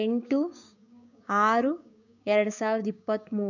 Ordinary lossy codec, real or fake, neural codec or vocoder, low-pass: none; fake; codec, 16 kHz, 6 kbps, DAC; 7.2 kHz